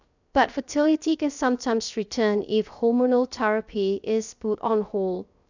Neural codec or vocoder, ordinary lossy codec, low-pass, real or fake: codec, 16 kHz, 0.3 kbps, FocalCodec; none; 7.2 kHz; fake